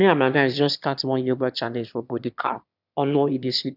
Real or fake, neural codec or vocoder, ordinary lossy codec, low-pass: fake; autoencoder, 22.05 kHz, a latent of 192 numbers a frame, VITS, trained on one speaker; none; 5.4 kHz